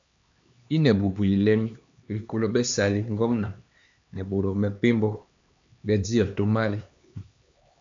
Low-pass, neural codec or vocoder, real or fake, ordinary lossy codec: 7.2 kHz; codec, 16 kHz, 2 kbps, X-Codec, HuBERT features, trained on LibriSpeech; fake; AAC, 64 kbps